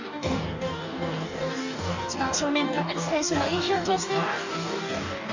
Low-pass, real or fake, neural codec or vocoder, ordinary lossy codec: 7.2 kHz; fake; codec, 44.1 kHz, 2.6 kbps, DAC; none